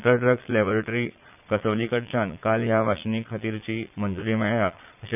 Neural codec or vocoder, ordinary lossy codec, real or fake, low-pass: vocoder, 22.05 kHz, 80 mel bands, Vocos; none; fake; 3.6 kHz